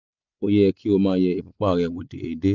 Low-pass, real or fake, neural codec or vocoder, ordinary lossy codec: 7.2 kHz; real; none; none